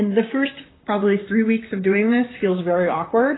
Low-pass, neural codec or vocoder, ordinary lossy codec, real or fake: 7.2 kHz; vocoder, 44.1 kHz, 128 mel bands, Pupu-Vocoder; AAC, 16 kbps; fake